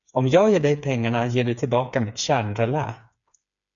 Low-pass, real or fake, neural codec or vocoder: 7.2 kHz; fake; codec, 16 kHz, 4 kbps, FreqCodec, smaller model